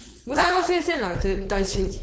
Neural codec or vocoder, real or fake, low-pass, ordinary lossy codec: codec, 16 kHz, 4.8 kbps, FACodec; fake; none; none